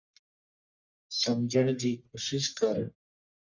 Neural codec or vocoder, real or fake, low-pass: codec, 44.1 kHz, 1.7 kbps, Pupu-Codec; fake; 7.2 kHz